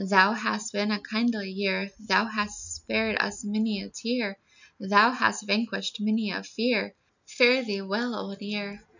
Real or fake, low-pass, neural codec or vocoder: real; 7.2 kHz; none